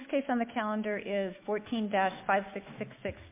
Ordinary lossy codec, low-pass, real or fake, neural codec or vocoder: MP3, 24 kbps; 3.6 kHz; fake; autoencoder, 48 kHz, 128 numbers a frame, DAC-VAE, trained on Japanese speech